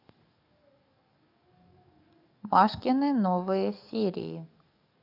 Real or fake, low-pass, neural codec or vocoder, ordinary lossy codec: fake; 5.4 kHz; codec, 44.1 kHz, 7.8 kbps, DAC; none